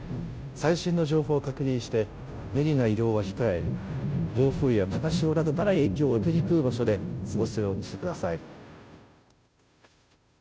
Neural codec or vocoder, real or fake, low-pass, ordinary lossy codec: codec, 16 kHz, 0.5 kbps, FunCodec, trained on Chinese and English, 25 frames a second; fake; none; none